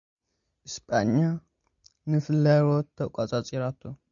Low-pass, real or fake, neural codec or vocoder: 7.2 kHz; real; none